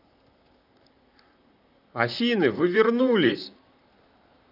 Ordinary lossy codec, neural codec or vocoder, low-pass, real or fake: none; vocoder, 44.1 kHz, 128 mel bands, Pupu-Vocoder; 5.4 kHz; fake